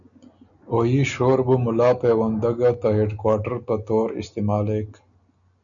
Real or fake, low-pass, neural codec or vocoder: real; 7.2 kHz; none